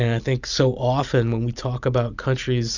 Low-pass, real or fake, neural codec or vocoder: 7.2 kHz; real; none